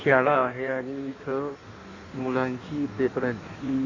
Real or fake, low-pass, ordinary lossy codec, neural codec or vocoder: fake; 7.2 kHz; AAC, 32 kbps; codec, 16 kHz in and 24 kHz out, 1.1 kbps, FireRedTTS-2 codec